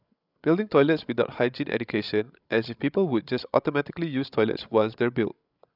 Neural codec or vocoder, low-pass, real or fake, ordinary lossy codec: codec, 16 kHz, 16 kbps, FreqCodec, larger model; 5.4 kHz; fake; none